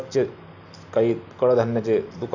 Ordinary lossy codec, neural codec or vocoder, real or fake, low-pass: none; none; real; 7.2 kHz